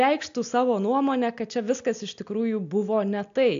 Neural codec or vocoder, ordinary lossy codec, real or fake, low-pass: none; AAC, 64 kbps; real; 7.2 kHz